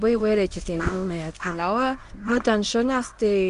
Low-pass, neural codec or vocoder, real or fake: 10.8 kHz; codec, 24 kHz, 0.9 kbps, WavTokenizer, medium speech release version 1; fake